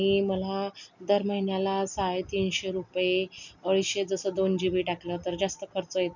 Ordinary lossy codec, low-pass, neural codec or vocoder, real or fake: none; 7.2 kHz; none; real